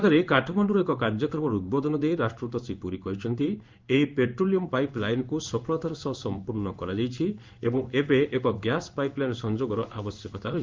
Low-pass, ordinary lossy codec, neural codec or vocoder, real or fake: 7.2 kHz; Opus, 24 kbps; codec, 16 kHz in and 24 kHz out, 1 kbps, XY-Tokenizer; fake